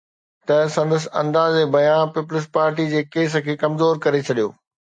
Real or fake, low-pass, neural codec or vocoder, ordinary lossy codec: real; 9.9 kHz; none; AAC, 64 kbps